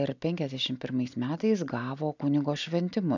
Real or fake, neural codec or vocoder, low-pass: real; none; 7.2 kHz